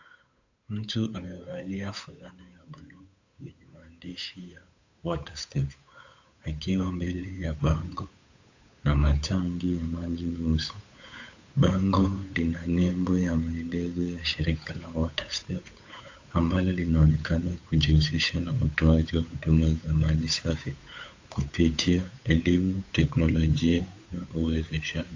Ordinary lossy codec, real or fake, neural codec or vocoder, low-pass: AAC, 48 kbps; fake; codec, 16 kHz, 8 kbps, FunCodec, trained on Chinese and English, 25 frames a second; 7.2 kHz